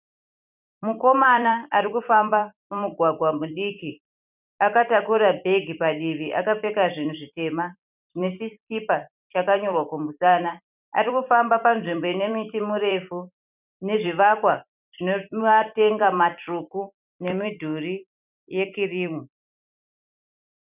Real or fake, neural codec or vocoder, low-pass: fake; vocoder, 44.1 kHz, 128 mel bands every 256 samples, BigVGAN v2; 3.6 kHz